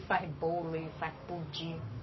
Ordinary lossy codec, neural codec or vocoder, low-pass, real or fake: MP3, 24 kbps; codec, 44.1 kHz, 7.8 kbps, Pupu-Codec; 7.2 kHz; fake